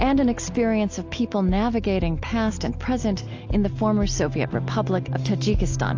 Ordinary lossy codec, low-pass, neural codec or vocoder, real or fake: AAC, 48 kbps; 7.2 kHz; none; real